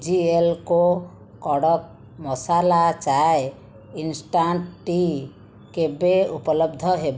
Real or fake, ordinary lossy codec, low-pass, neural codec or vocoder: real; none; none; none